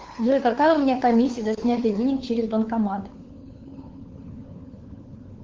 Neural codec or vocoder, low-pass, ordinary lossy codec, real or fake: codec, 16 kHz, 4 kbps, FunCodec, trained on LibriTTS, 50 frames a second; 7.2 kHz; Opus, 16 kbps; fake